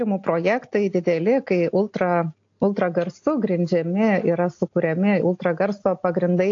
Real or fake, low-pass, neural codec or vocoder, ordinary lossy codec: real; 7.2 kHz; none; AAC, 48 kbps